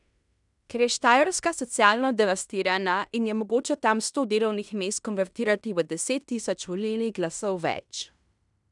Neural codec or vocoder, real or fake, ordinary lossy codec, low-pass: codec, 16 kHz in and 24 kHz out, 0.9 kbps, LongCat-Audio-Codec, fine tuned four codebook decoder; fake; none; 10.8 kHz